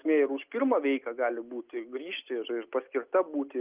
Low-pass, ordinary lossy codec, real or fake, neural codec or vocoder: 3.6 kHz; Opus, 24 kbps; real; none